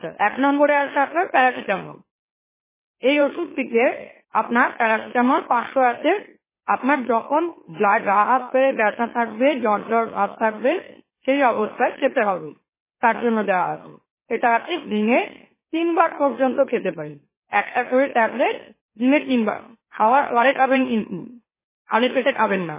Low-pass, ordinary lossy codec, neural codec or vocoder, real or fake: 3.6 kHz; MP3, 16 kbps; autoencoder, 44.1 kHz, a latent of 192 numbers a frame, MeloTTS; fake